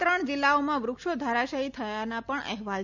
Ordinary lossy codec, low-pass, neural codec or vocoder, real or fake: none; 7.2 kHz; none; real